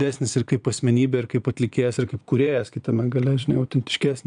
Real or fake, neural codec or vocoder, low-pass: fake; vocoder, 22.05 kHz, 80 mel bands, Vocos; 9.9 kHz